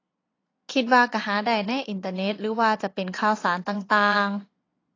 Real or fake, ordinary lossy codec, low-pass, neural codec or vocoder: fake; AAC, 32 kbps; 7.2 kHz; vocoder, 22.05 kHz, 80 mel bands, Vocos